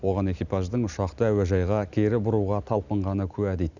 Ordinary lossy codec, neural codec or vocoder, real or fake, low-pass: none; none; real; 7.2 kHz